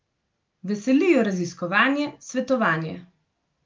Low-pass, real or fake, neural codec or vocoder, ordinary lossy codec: 7.2 kHz; real; none; Opus, 24 kbps